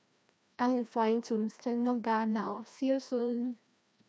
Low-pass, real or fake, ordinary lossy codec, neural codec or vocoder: none; fake; none; codec, 16 kHz, 1 kbps, FreqCodec, larger model